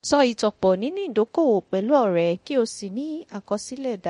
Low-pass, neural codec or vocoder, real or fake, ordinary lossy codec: 10.8 kHz; codec, 24 kHz, 0.9 kbps, DualCodec; fake; MP3, 48 kbps